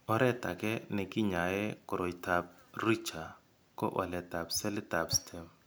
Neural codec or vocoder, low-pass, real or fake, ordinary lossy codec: none; none; real; none